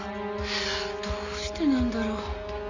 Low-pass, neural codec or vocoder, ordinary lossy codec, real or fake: 7.2 kHz; none; none; real